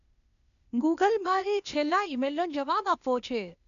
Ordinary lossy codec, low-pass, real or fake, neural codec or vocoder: none; 7.2 kHz; fake; codec, 16 kHz, 0.8 kbps, ZipCodec